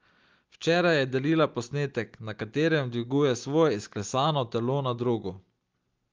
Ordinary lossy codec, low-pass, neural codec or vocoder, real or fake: Opus, 32 kbps; 7.2 kHz; none; real